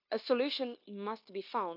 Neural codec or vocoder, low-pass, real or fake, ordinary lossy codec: codec, 16 kHz, 0.9 kbps, LongCat-Audio-Codec; 5.4 kHz; fake; none